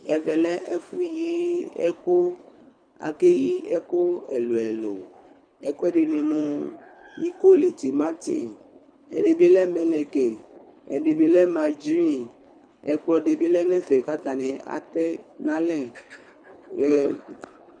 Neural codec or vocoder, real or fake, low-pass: codec, 24 kHz, 3 kbps, HILCodec; fake; 9.9 kHz